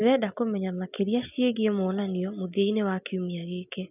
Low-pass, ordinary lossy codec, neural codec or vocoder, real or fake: 3.6 kHz; none; none; real